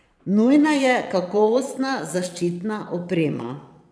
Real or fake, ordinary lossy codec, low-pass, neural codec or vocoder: fake; none; none; vocoder, 22.05 kHz, 80 mel bands, Vocos